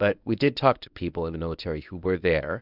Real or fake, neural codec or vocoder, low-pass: fake; codec, 24 kHz, 0.9 kbps, WavTokenizer, medium speech release version 1; 5.4 kHz